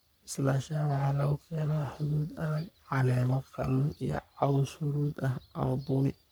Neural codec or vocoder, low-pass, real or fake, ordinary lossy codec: codec, 44.1 kHz, 3.4 kbps, Pupu-Codec; none; fake; none